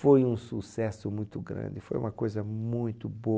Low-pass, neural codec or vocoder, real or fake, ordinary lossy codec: none; none; real; none